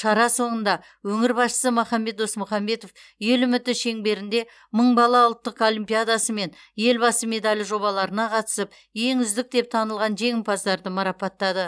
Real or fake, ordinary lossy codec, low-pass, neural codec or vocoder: real; none; none; none